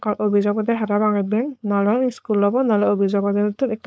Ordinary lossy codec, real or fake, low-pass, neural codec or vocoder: none; fake; none; codec, 16 kHz, 4.8 kbps, FACodec